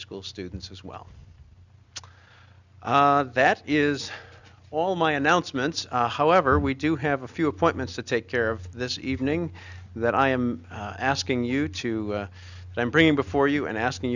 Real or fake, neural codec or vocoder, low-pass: real; none; 7.2 kHz